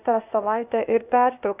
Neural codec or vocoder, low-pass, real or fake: codec, 16 kHz, 0.8 kbps, ZipCodec; 3.6 kHz; fake